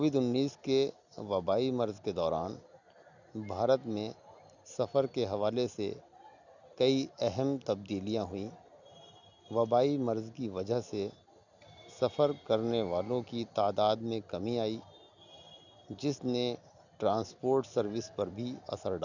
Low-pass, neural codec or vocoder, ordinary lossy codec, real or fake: 7.2 kHz; none; none; real